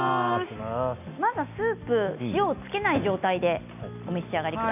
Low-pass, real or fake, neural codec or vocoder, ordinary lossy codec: 3.6 kHz; real; none; none